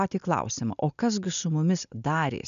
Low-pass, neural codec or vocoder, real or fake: 7.2 kHz; none; real